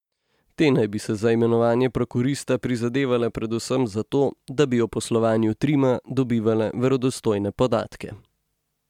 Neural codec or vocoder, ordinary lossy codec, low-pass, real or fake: none; MP3, 96 kbps; 19.8 kHz; real